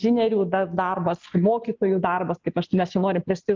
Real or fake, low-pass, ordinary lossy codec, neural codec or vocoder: real; 7.2 kHz; Opus, 24 kbps; none